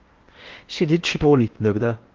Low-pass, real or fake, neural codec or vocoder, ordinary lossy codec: 7.2 kHz; fake; codec, 16 kHz in and 24 kHz out, 0.8 kbps, FocalCodec, streaming, 65536 codes; Opus, 16 kbps